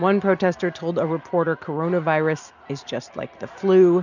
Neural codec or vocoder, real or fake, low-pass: none; real; 7.2 kHz